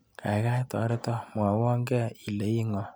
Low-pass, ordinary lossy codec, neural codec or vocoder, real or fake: none; none; none; real